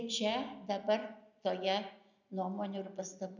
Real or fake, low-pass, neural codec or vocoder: real; 7.2 kHz; none